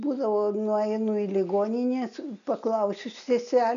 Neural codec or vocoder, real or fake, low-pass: none; real; 7.2 kHz